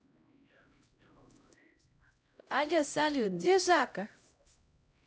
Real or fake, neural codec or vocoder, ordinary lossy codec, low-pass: fake; codec, 16 kHz, 0.5 kbps, X-Codec, HuBERT features, trained on LibriSpeech; none; none